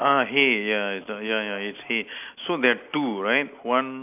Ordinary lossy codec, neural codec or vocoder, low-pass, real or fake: none; none; 3.6 kHz; real